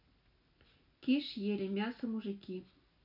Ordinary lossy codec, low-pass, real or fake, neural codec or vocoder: none; 5.4 kHz; real; none